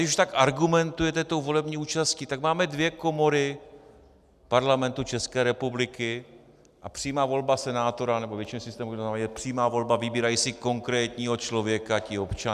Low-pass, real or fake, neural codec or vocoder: 14.4 kHz; real; none